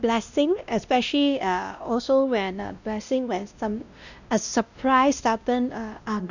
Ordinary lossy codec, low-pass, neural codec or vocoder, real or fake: none; 7.2 kHz; codec, 16 kHz, 1 kbps, X-Codec, WavLM features, trained on Multilingual LibriSpeech; fake